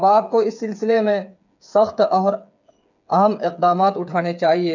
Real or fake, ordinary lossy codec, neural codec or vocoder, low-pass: fake; none; codec, 16 kHz, 8 kbps, FreqCodec, smaller model; 7.2 kHz